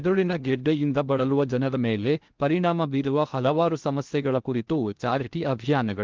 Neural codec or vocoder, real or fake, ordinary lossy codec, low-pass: codec, 16 kHz in and 24 kHz out, 0.6 kbps, FocalCodec, streaming, 2048 codes; fake; Opus, 32 kbps; 7.2 kHz